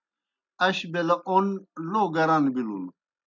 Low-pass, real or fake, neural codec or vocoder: 7.2 kHz; real; none